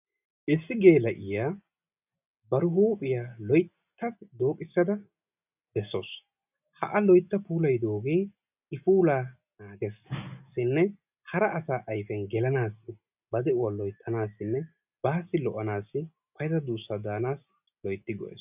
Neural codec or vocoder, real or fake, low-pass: none; real; 3.6 kHz